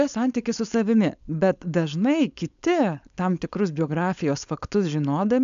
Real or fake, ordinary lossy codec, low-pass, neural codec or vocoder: fake; AAC, 96 kbps; 7.2 kHz; codec, 16 kHz, 4.8 kbps, FACodec